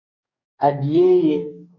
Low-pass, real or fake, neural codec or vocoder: 7.2 kHz; fake; codec, 44.1 kHz, 2.6 kbps, DAC